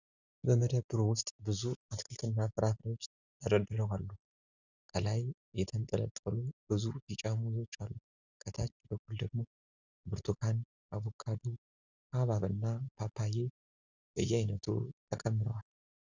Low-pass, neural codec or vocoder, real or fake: 7.2 kHz; none; real